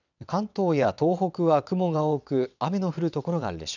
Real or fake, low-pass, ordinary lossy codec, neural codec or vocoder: fake; 7.2 kHz; none; vocoder, 22.05 kHz, 80 mel bands, WaveNeXt